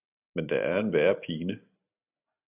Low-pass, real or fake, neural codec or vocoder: 3.6 kHz; real; none